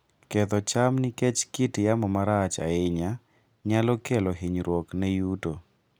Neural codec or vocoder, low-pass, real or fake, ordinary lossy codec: none; none; real; none